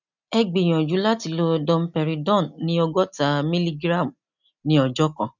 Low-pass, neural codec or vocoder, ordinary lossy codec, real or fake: 7.2 kHz; none; none; real